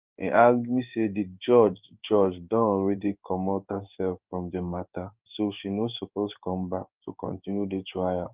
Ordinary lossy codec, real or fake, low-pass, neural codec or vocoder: Opus, 24 kbps; fake; 3.6 kHz; codec, 16 kHz in and 24 kHz out, 1 kbps, XY-Tokenizer